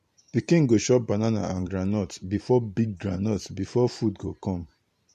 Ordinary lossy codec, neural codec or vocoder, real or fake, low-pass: MP3, 64 kbps; vocoder, 44.1 kHz, 128 mel bands every 512 samples, BigVGAN v2; fake; 14.4 kHz